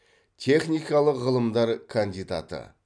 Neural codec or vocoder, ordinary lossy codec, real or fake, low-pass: none; none; real; 9.9 kHz